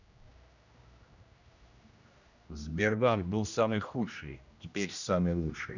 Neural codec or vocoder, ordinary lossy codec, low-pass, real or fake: codec, 16 kHz, 1 kbps, X-Codec, HuBERT features, trained on general audio; none; 7.2 kHz; fake